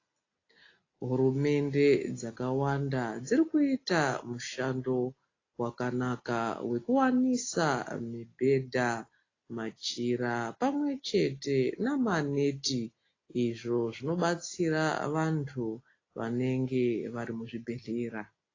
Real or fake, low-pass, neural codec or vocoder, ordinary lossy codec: real; 7.2 kHz; none; AAC, 32 kbps